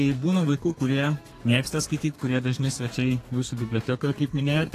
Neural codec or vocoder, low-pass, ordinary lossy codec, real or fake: codec, 32 kHz, 1.9 kbps, SNAC; 14.4 kHz; AAC, 48 kbps; fake